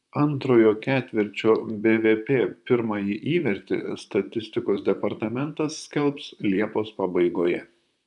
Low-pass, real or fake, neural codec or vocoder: 10.8 kHz; fake; vocoder, 44.1 kHz, 128 mel bands, Pupu-Vocoder